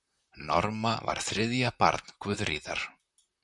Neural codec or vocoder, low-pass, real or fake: vocoder, 44.1 kHz, 128 mel bands, Pupu-Vocoder; 10.8 kHz; fake